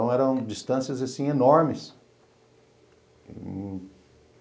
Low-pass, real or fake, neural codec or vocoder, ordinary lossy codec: none; real; none; none